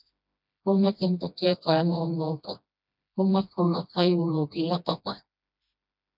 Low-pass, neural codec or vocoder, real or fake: 5.4 kHz; codec, 16 kHz, 1 kbps, FreqCodec, smaller model; fake